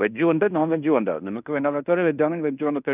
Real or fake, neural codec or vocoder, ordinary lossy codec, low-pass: fake; codec, 16 kHz in and 24 kHz out, 0.9 kbps, LongCat-Audio-Codec, fine tuned four codebook decoder; none; 3.6 kHz